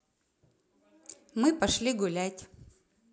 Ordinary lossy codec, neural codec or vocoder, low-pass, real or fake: none; none; none; real